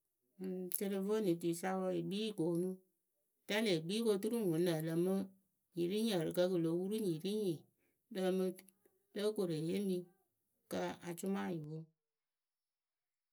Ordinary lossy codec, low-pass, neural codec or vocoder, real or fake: none; none; none; real